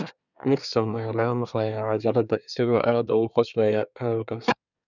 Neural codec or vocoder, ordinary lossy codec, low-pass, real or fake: codec, 24 kHz, 1 kbps, SNAC; none; 7.2 kHz; fake